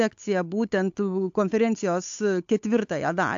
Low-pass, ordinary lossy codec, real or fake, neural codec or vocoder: 7.2 kHz; AAC, 64 kbps; fake; codec, 16 kHz, 8 kbps, FunCodec, trained on Chinese and English, 25 frames a second